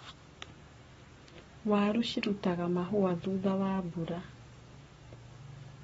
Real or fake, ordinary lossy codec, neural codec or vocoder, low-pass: fake; AAC, 24 kbps; codec, 44.1 kHz, 7.8 kbps, Pupu-Codec; 19.8 kHz